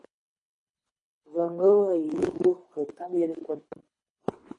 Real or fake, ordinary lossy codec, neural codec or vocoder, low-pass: fake; MP3, 48 kbps; codec, 24 kHz, 3 kbps, HILCodec; 10.8 kHz